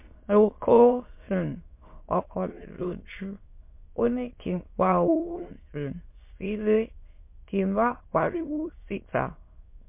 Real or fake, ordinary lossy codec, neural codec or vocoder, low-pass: fake; MP3, 24 kbps; autoencoder, 22.05 kHz, a latent of 192 numbers a frame, VITS, trained on many speakers; 3.6 kHz